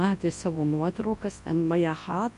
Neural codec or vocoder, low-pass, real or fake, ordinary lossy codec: codec, 24 kHz, 0.9 kbps, WavTokenizer, large speech release; 10.8 kHz; fake; MP3, 48 kbps